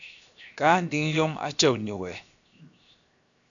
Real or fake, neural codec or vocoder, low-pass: fake; codec, 16 kHz, 0.7 kbps, FocalCodec; 7.2 kHz